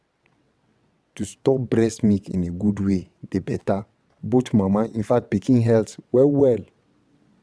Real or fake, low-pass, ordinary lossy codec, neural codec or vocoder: fake; none; none; vocoder, 22.05 kHz, 80 mel bands, WaveNeXt